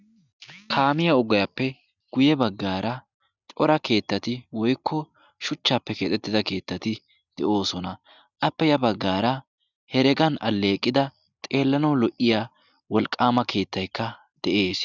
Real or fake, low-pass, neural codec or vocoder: real; 7.2 kHz; none